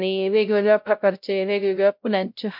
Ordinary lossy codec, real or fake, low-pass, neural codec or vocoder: MP3, 48 kbps; fake; 5.4 kHz; codec, 16 kHz, 0.5 kbps, X-Codec, WavLM features, trained on Multilingual LibriSpeech